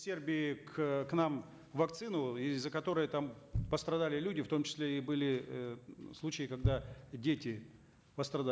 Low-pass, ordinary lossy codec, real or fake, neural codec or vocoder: none; none; real; none